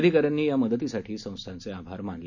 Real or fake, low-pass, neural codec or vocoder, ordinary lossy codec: real; 7.2 kHz; none; none